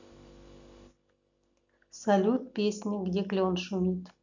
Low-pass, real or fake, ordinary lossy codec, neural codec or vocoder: 7.2 kHz; real; none; none